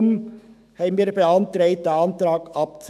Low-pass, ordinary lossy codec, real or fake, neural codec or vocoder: 14.4 kHz; none; fake; autoencoder, 48 kHz, 128 numbers a frame, DAC-VAE, trained on Japanese speech